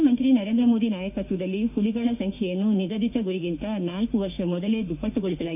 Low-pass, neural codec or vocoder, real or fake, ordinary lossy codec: 3.6 kHz; autoencoder, 48 kHz, 32 numbers a frame, DAC-VAE, trained on Japanese speech; fake; none